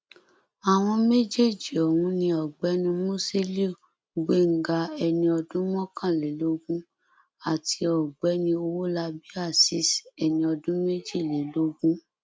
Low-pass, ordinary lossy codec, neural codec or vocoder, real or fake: none; none; none; real